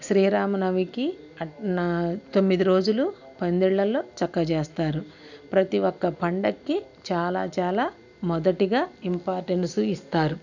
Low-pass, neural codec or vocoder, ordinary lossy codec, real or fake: 7.2 kHz; none; none; real